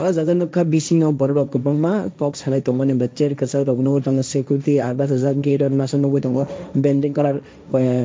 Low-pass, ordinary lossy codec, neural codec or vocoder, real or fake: none; none; codec, 16 kHz, 1.1 kbps, Voila-Tokenizer; fake